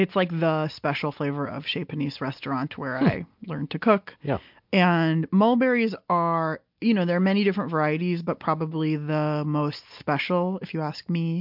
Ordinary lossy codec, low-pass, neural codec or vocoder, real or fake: MP3, 48 kbps; 5.4 kHz; none; real